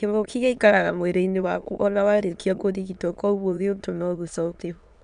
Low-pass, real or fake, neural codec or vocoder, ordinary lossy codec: 9.9 kHz; fake; autoencoder, 22.05 kHz, a latent of 192 numbers a frame, VITS, trained on many speakers; none